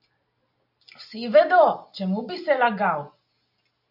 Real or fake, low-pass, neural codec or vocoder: real; 5.4 kHz; none